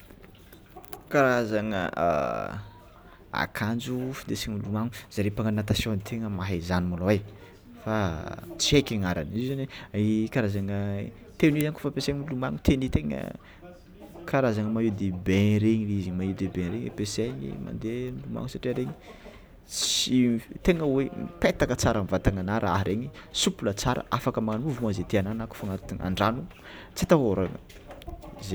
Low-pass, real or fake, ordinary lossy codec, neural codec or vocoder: none; real; none; none